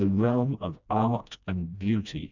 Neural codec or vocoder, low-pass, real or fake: codec, 16 kHz, 1 kbps, FreqCodec, smaller model; 7.2 kHz; fake